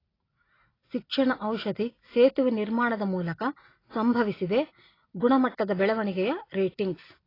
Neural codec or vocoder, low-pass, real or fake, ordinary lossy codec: none; 5.4 kHz; real; AAC, 24 kbps